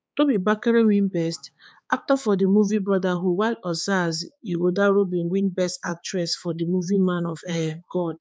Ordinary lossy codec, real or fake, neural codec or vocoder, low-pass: none; fake; codec, 16 kHz, 4 kbps, X-Codec, HuBERT features, trained on balanced general audio; none